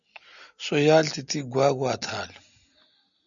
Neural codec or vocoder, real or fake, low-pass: none; real; 7.2 kHz